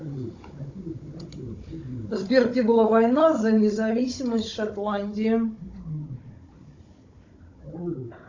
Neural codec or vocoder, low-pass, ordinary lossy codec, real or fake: codec, 16 kHz, 16 kbps, FunCodec, trained on Chinese and English, 50 frames a second; 7.2 kHz; AAC, 48 kbps; fake